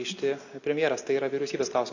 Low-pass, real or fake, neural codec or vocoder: 7.2 kHz; real; none